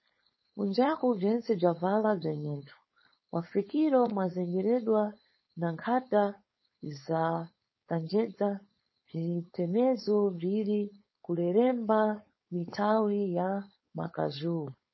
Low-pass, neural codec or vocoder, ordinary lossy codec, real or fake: 7.2 kHz; codec, 16 kHz, 4.8 kbps, FACodec; MP3, 24 kbps; fake